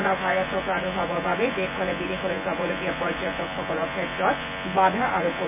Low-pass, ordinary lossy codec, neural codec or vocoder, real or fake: 3.6 kHz; none; vocoder, 24 kHz, 100 mel bands, Vocos; fake